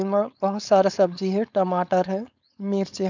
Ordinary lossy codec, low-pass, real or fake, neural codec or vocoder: none; 7.2 kHz; fake; codec, 16 kHz, 4.8 kbps, FACodec